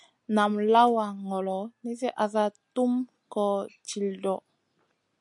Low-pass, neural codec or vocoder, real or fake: 10.8 kHz; none; real